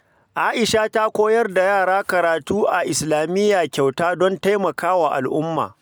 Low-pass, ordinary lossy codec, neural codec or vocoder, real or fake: none; none; none; real